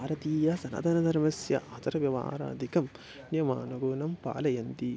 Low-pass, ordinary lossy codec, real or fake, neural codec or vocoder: none; none; real; none